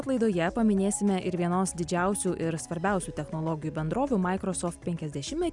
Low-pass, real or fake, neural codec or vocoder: 10.8 kHz; real; none